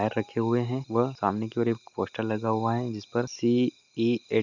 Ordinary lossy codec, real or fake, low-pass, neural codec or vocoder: none; real; 7.2 kHz; none